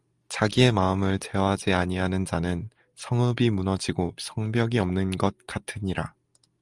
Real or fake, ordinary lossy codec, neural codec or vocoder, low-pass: real; Opus, 32 kbps; none; 10.8 kHz